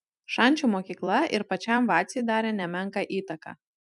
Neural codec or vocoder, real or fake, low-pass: vocoder, 44.1 kHz, 128 mel bands every 256 samples, BigVGAN v2; fake; 10.8 kHz